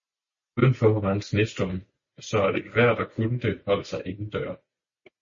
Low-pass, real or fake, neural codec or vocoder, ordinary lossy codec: 7.2 kHz; real; none; MP3, 32 kbps